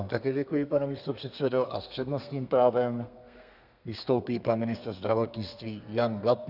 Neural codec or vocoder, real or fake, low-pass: codec, 32 kHz, 1.9 kbps, SNAC; fake; 5.4 kHz